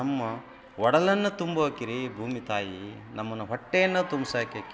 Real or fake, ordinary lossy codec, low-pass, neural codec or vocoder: real; none; none; none